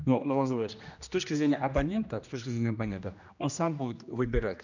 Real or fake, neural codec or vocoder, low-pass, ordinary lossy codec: fake; codec, 16 kHz, 1 kbps, X-Codec, HuBERT features, trained on general audio; 7.2 kHz; none